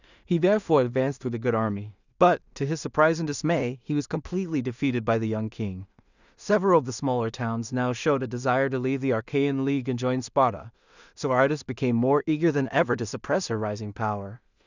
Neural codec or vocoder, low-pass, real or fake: codec, 16 kHz in and 24 kHz out, 0.4 kbps, LongCat-Audio-Codec, two codebook decoder; 7.2 kHz; fake